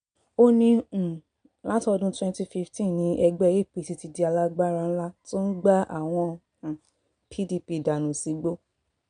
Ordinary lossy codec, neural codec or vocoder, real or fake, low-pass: MP3, 64 kbps; none; real; 9.9 kHz